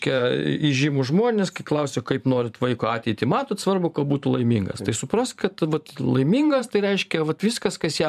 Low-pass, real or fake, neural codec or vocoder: 14.4 kHz; fake; vocoder, 44.1 kHz, 128 mel bands every 512 samples, BigVGAN v2